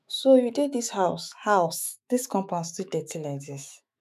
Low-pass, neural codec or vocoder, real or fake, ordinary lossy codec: 14.4 kHz; autoencoder, 48 kHz, 128 numbers a frame, DAC-VAE, trained on Japanese speech; fake; none